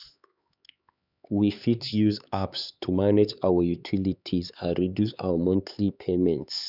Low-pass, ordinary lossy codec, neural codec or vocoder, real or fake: 5.4 kHz; none; codec, 16 kHz, 4 kbps, X-Codec, HuBERT features, trained on LibriSpeech; fake